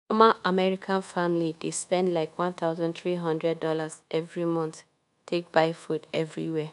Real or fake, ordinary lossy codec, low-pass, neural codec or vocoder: fake; none; 10.8 kHz; codec, 24 kHz, 1.2 kbps, DualCodec